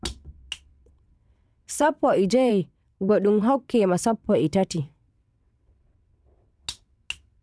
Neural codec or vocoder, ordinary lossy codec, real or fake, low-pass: vocoder, 22.05 kHz, 80 mel bands, WaveNeXt; none; fake; none